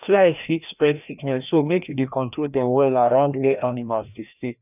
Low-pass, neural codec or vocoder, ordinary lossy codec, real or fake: 3.6 kHz; codec, 16 kHz, 1 kbps, FreqCodec, larger model; none; fake